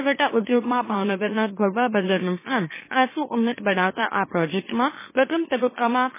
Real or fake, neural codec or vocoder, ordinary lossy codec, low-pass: fake; autoencoder, 44.1 kHz, a latent of 192 numbers a frame, MeloTTS; MP3, 16 kbps; 3.6 kHz